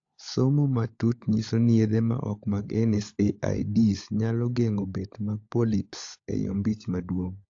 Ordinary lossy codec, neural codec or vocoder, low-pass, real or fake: AAC, 48 kbps; codec, 16 kHz, 16 kbps, FunCodec, trained on LibriTTS, 50 frames a second; 7.2 kHz; fake